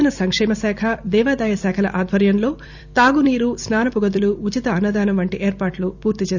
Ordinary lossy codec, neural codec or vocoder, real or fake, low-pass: none; vocoder, 44.1 kHz, 128 mel bands every 256 samples, BigVGAN v2; fake; 7.2 kHz